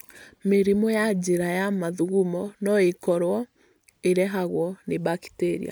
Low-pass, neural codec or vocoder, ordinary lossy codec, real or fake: none; none; none; real